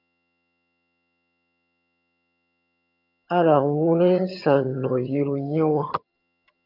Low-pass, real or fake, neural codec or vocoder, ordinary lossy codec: 5.4 kHz; fake; vocoder, 22.05 kHz, 80 mel bands, HiFi-GAN; MP3, 48 kbps